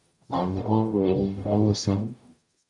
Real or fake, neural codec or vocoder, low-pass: fake; codec, 44.1 kHz, 0.9 kbps, DAC; 10.8 kHz